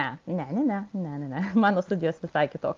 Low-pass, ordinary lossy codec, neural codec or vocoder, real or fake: 7.2 kHz; Opus, 32 kbps; none; real